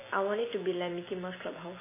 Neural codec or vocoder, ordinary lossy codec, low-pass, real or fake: none; MP3, 16 kbps; 3.6 kHz; real